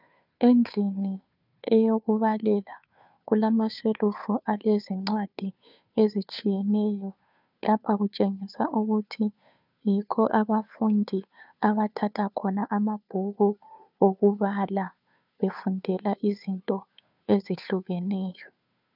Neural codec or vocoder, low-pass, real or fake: codec, 16 kHz, 2 kbps, FunCodec, trained on Chinese and English, 25 frames a second; 5.4 kHz; fake